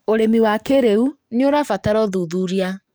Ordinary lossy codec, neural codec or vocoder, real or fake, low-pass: none; codec, 44.1 kHz, 7.8 kbps, DAC; fake; none